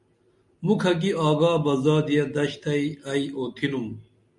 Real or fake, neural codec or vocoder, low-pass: real; none; 10.8 kHz